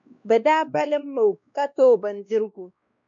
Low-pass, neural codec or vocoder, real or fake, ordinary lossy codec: 7.2 kHz; codec, 16 kHz, 1 kbps, X-Codec, WavLM features, trained on Multilingual LibriSpeech; fake; MP3, 64 kbps